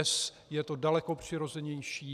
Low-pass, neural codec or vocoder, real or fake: 14.4 kHz; none; real